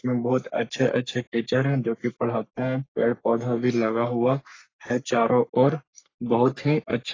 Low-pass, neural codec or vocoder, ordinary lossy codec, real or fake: 7.2 kHz; codec, 44.1 kHz, 3.4 kbps, Pupu-Codec; AAC, 32 kbps; fake